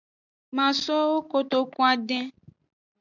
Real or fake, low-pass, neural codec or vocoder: real; 7.2 kHz; none